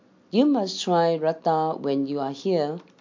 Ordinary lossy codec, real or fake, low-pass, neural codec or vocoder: MP3, 48 kbps; real; 7.2 kHz; none